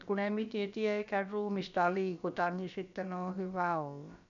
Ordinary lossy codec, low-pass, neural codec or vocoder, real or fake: none; 7.2 kHz; codec, 16 kHz, about 1 kbps, DyCAST, with the encoder's durations; fake